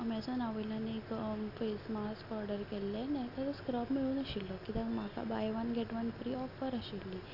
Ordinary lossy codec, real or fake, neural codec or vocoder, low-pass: none; real; none; 5.4 kHz